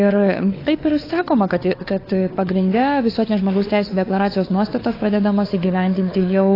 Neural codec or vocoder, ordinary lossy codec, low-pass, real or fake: codec, 16 kHz, 4 kbps, FunCodec, trained on LibriTTS, 50 frames a second; AAC, 24 kbps; 5.4 kHz; fake